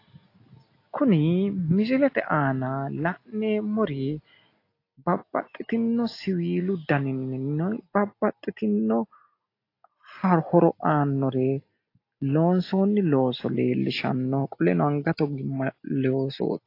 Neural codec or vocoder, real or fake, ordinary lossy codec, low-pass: none; real; AAC, 32 kbps; 5.4 kHz